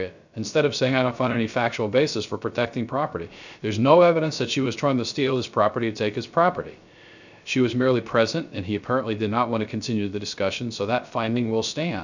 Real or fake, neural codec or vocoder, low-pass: fake; codec, 16 kHz, 0.3 kbps, FocalCodec; 7.2 kHz